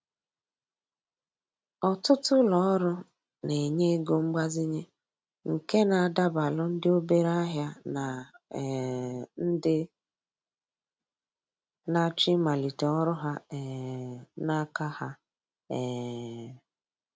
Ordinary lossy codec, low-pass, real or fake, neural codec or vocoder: none; none; real; none